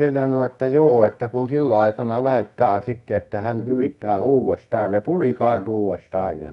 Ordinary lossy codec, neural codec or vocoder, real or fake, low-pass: none; codec, 24 kHz, 0.9 kbps, WavTokenizer, medium music audio release; fake; 10.8 kHz